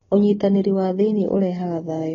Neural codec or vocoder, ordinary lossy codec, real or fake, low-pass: none; AAC, 24 kbps; real; 7.2 kHz